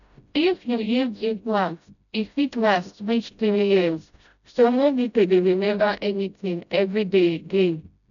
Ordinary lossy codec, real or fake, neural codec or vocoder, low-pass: none; fake; codec, 16 kHz, 0.5 kbps, FreqCodec, smaller model; 7.2 kHz